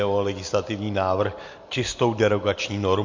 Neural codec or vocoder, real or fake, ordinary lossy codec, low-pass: none; real; MP3, 48 kbps; 7.2 kHz